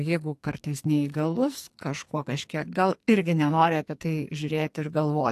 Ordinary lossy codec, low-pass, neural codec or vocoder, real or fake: AAC, 64 kbps; 14.4 kHz; codec, 44.1 kHz, 2.6 kbps, SNAC; fake